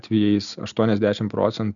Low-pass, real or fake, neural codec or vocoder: 7.2 kHz; real; none